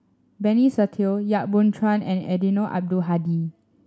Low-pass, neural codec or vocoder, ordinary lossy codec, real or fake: none; none; none; real